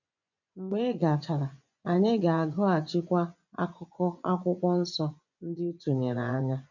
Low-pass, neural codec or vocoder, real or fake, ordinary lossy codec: 7.2 kHz; vocoder, 24 kHz, 100 mel bands, Vocos; fake; none